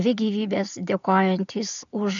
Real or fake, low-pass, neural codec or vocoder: real; 7.2 kHz; none